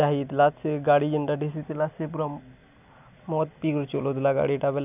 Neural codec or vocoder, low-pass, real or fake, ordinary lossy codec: none; 3.6 kHz; real; none